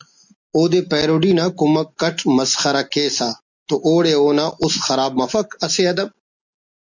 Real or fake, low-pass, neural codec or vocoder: real; 7.2 kHz; none